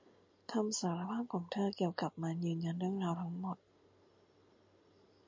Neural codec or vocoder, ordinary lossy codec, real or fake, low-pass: none; MP3, 64 kbps; real; 7.2 kHz